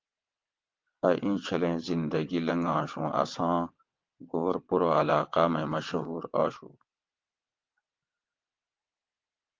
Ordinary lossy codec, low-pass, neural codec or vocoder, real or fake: Opus, 32 kbps; 7.2 kHz; vocoder, 22.05 kHz, 80 mel bands, WaveNeXt; fake